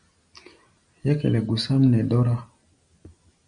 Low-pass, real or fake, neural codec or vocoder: 9.9 kHz; real; none